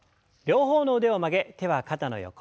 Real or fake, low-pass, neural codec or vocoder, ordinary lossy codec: real; none; none; none